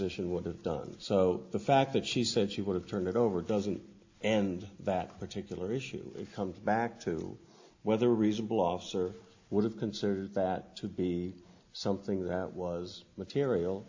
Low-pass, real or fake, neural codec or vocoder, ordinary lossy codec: 7.2 kHz; real; none; AAC, 48 kbps